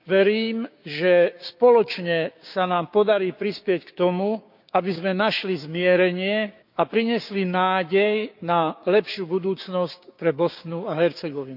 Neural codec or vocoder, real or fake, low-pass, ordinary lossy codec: codec, 16 kHz, 6 kbps, DAC; fake; 5.4 kHz; none